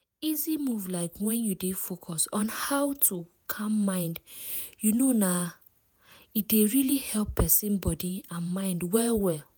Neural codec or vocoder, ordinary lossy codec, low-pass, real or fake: vocoder, 48 kHz, 128 mel bands, Vocos; none; none; fake